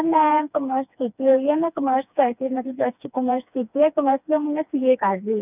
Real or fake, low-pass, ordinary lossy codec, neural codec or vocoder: fake; 3.6 kHz; none; codec, 16 kHz, 2 kbps, FreqCodec, smaller model